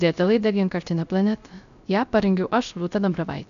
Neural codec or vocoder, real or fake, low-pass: codec, 16 kHz, 0.3 kbps, FocalCodec; fake; 7.2 kHz